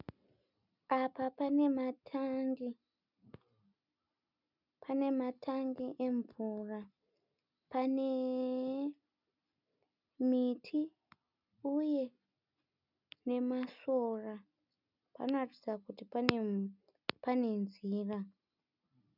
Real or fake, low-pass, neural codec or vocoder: real; 5.4 kHz; none